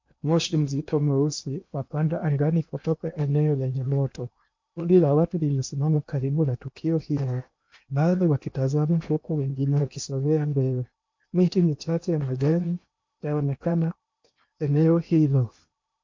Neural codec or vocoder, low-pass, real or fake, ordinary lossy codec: codec, 16 kHz in and 24 kHz out, 0.8 kbps, FocalCodec, streaming, 65536 codes; 7.2 kHz; fake; MP3, 48 kbps